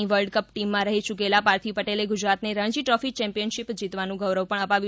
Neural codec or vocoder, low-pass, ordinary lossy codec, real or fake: none; none; none; real